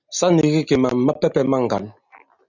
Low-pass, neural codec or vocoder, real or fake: 7.2 kHz; none; real